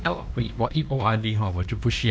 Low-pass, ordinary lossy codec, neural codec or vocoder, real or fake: none; none; codec, 16 kHz, 1 kbps, X-Codec, WavLM features, trained on Multilingual LibriSpeech; fake